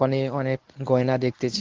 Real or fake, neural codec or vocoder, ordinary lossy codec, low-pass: fake; codec, 24 kHz, 0.9 kbps, WavTokenizer, medium speech release version 1; Opus, 16 kbps; 7.2 kHz